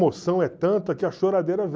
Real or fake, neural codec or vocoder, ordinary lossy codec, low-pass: real; none; none; none